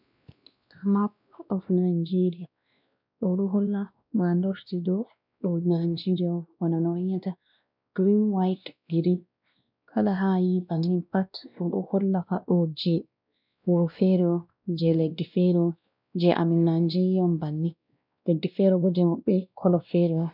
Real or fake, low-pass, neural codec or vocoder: fake; 5.4 kHz; codec, 16 kHz, 1 kbps, X-Codec, WavLM features, trained on Multilingual LibriSpeech